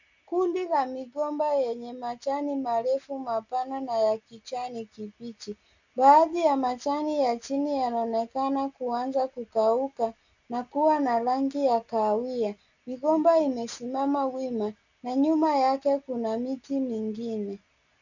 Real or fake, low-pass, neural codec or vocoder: real; 7.2 kHz; none